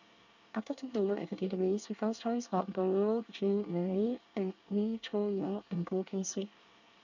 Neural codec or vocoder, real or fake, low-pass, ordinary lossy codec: codec, 24 kHz, 1 kbps, SNAC; fake; 7.2 kHz; none